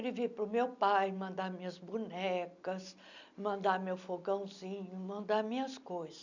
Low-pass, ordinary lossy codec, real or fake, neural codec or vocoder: 7.2 kHz; none; real; none